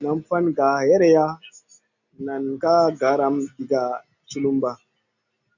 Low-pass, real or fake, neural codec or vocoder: 7.2 kHz; real; none